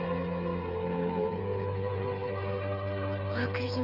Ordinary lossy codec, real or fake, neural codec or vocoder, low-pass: Opus, 64 kbps; fake; codec, 16 kHz, 8 kbps, FreqCodec, smaller model; 5.4 kHz